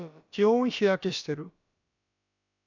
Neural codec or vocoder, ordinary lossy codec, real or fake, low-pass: codec, 16 kHz, about 1 kbps, DyCAST, with the encoder's durations; none; fake; 7.2 kHz